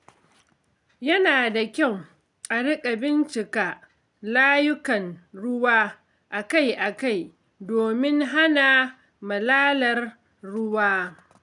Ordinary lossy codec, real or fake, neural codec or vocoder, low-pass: none; real; none; 10.8 kHz